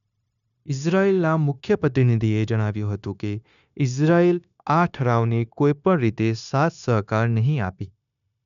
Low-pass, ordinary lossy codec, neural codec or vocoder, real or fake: 7.2 kHz; none; codec, 16 kHz, 0.9 kbps, LongCat-Audio-Codec; fake